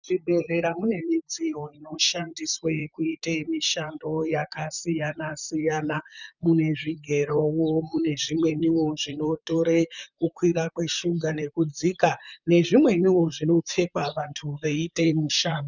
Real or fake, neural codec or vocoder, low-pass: fake; vocoder, 44.1 kHz, 128 mel bands, Pupu-Vocoder; 7.2 kHz